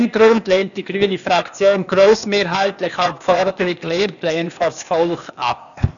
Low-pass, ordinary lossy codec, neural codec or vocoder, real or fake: 7.2 kHz; none; codec, 16 kHz, 0.8 kbps, ZipCodec; fake